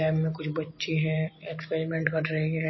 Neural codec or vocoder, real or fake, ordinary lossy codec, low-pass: none; real; MP3, 24 kbps; 7.2 kHz